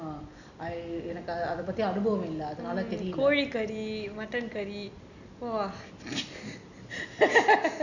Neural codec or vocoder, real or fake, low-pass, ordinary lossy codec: none; real; 7.2 kHz; none